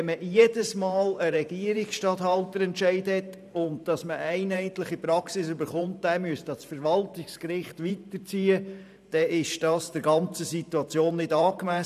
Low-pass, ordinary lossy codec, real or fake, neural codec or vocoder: 14.4 kHz; none; fake; vocoder, 44.1 kHz, 128 mel bands every 512 samples, BigVGAN v2